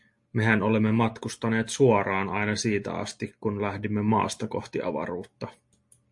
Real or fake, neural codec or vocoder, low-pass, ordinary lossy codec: real; none; 10.8 kHz; MP3, 48 kbps